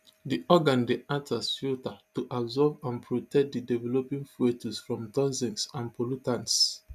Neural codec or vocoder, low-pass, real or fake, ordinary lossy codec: none; 14.4 kHz; real; none